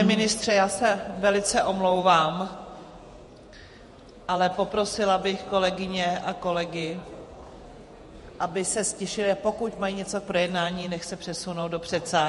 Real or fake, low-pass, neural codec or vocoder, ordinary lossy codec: fake; 14.4 kHz; vocoder, 48 kHz, 128 mel bands, Vocos; MP3, 48 kbps